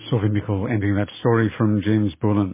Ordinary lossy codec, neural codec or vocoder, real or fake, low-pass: MP3, 16 kbps; none; real; 3.6 kHz